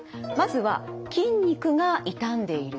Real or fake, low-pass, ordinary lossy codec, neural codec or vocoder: real; none; none; none